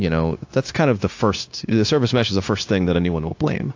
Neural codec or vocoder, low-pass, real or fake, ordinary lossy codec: codec, 16 kHz, 0.9 kbps, LongCat-Audio-Codec; 7.2 kHz; fake; AAC, 48 kbps